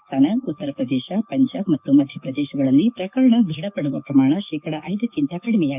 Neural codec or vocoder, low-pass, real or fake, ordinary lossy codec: codec, 44.1 kHz, 7.8 kbps, DAC; 3.6 kHz; fake; none